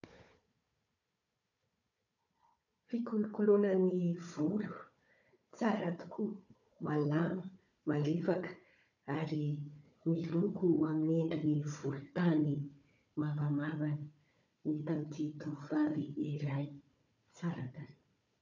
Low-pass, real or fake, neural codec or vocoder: 7.2 kHz; fake; codec, 16 kHz, 4 kbps, FunCodec, trained on Chinese and English, 50 frames a second